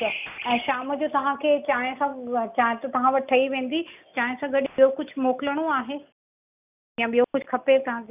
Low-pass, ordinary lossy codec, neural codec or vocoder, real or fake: 3.6 kHz; none; none; real